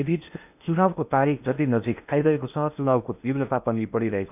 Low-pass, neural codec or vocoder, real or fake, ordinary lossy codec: 3.6 kHz; codec, 16 kHz in and 24 kHz out, 0.8 kbps, FocalCodec, streaming, 65536 codes; fake; none